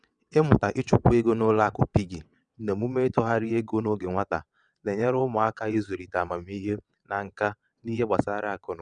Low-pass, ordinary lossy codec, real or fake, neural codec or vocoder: 9.9 kHz; none; fake; vocoder, 22.05 kHz, 80 mel bands, WaveNeXt